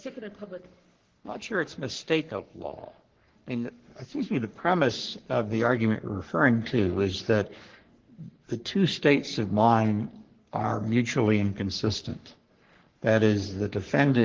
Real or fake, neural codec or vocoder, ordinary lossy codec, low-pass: fake; codec, 44.1 kHz, 3.4 kbps, Pupu-Codec; Opus, 16 kbps; 7.2 kHz